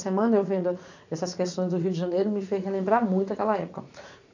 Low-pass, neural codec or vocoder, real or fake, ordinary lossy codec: 7.2 kHz; vocoder, 44.1 kHz, 80 mel bands, Vocos; fake; none